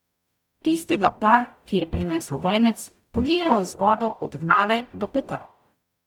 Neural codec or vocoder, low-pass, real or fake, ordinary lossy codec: codec, 44.1 kHz, 0.9 kbps, DAC; 19.8 kHz; fake; none